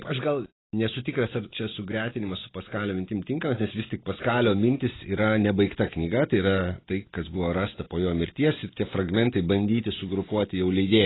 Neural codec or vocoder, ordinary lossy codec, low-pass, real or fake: none; AAC, 16 kbps; 7.2 kHz; real